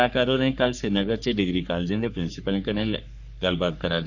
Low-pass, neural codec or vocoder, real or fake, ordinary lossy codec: 7.2 kHz; codec, 44.1 kHz, 3.4 kbps, Pupu-Codec; fake; none